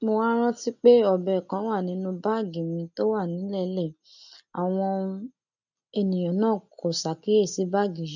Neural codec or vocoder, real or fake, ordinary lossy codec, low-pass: none; real; none; 7.2 kHz